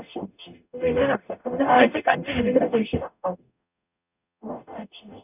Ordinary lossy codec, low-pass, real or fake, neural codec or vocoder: none; 3.6 kHz; fake; codec, 44.1 kHz, 0.9 kbps, DAC